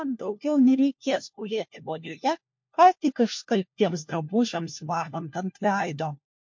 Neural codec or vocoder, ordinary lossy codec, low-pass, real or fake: codec, 16 kHz, 1 kbps, FunCodec, trained on LibriTTS, 50 frames a second; MP3, 48 kbps; 7.2 kHz; fake